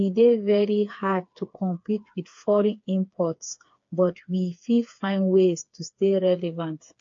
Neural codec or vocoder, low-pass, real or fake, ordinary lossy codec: codec, 16 kHz, 4 kbps, FreqCodec, smaller model; 7.2 kHz; fake; AAC, 48 kbps